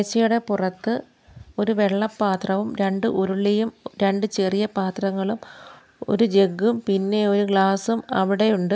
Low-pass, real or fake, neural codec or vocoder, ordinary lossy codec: none; real; none; none